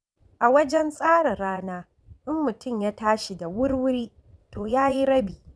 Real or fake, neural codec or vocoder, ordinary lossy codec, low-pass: fake; vocoder, 22.05 kHz, 80 mel bands, Vocos; none; none